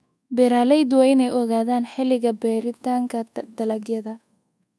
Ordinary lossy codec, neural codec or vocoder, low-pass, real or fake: none; codec, 24 kHz, 1.2 kbps, DualCodec; none; fake